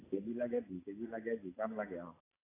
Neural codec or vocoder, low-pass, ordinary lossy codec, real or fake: none; 3.6 kHz; AAC, 16 kbps; real